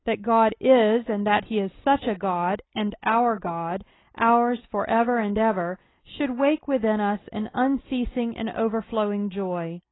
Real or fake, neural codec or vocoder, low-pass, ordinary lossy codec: real; none; 7.2 kHz; AAC, 16 kbps